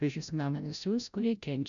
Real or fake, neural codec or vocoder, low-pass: fake; codec, 16 kHz, 0.5 kbps, FreqCodec, larger model; 7.2 kHz